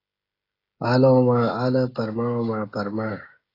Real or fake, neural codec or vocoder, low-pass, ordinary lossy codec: fake; codec, 16 kHz, 16 kbps, FreqCodec, smaller model; 5.4 kHz; AAC, 24 kbps